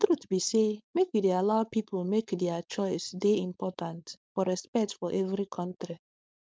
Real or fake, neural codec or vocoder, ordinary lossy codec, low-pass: fake; codec, 16 kHz, 4.8 kbps, FACodec; none; none